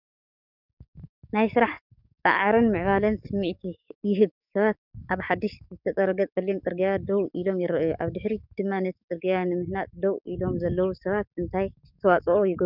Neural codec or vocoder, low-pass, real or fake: codec, 44.1 kHz, 7.8 kbps, DAC; 5.4 kHz; fake